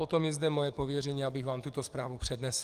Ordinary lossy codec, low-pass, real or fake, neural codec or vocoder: Opus, 32 kbps; 14.4 kHz; fake; codec, 44.1 kHz, 7.8 kbps, DAC